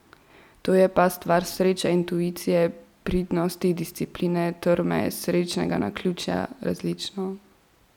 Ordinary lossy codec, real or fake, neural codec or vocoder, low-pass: none; real; none; 19.8 kHz